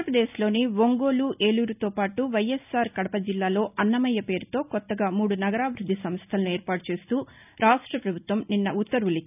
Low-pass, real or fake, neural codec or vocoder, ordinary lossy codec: 3.6 kHz; real; none; none